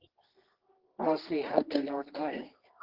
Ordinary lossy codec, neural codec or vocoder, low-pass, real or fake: Opus, 16 kbps; codec, 24 kHz, 0.9 kbps, WavTokenizer, medium music audio release; 5.4 kHz; fake